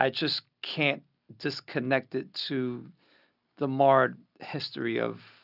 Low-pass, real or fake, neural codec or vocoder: 5.4 kHz; real; none